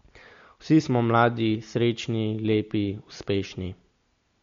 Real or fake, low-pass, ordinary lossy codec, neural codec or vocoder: real; 7.2 kHz; MP3, 48 kbps; none